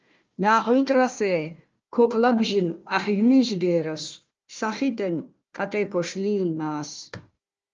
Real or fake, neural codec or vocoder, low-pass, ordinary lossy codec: fake; codec, 16 kHz, 1 kbps, FunCodec, trained on Chinese and English, 50 frames a second; 7.2 kHz; Opus, 24 kbps